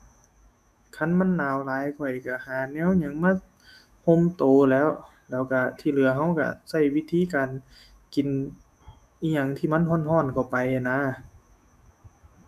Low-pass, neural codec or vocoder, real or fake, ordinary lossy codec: 14.4 kHz; autoencoder, 48 kHz, 128 numbers a frame, DAC-VAE, trained on Japanese speech; fake; none